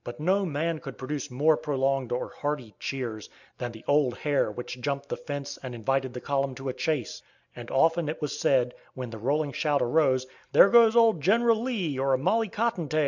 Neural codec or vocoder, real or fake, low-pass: none; real; 7.2 kHz